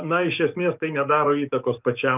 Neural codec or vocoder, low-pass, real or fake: codec, 44.1 kHz, 7.8 kbps, DAC; 3.6 kHz; fake